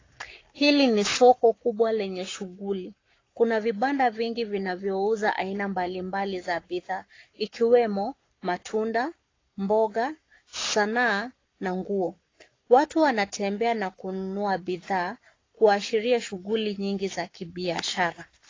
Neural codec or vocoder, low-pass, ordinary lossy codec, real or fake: codec, 44.1 kHz, 7.8 kbps, Pupu-Codec; 7.2 kHz; AAC, 32 kbps; fake